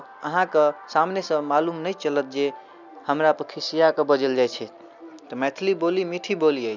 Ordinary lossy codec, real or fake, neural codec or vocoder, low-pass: none; real; none; 7.2 kHz